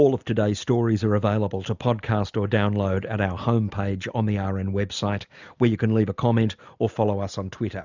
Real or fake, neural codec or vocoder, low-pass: real; none; 7.2 kHz